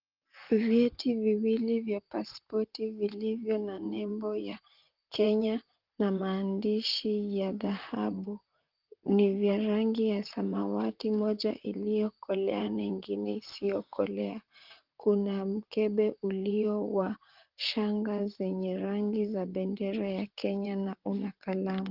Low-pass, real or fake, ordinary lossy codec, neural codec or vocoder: 5.4 kHz; fake; Opus, 24 kbps; vocoder, 44.1 kHz, 128 mel bands, Pupu-Vocoder